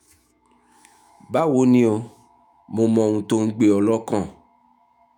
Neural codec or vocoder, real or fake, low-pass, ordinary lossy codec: autoencoder, 48 kHz, 128 numbers a frame, DAC-VAE, trained on Japanese speech; fake; 19.8 kHz; none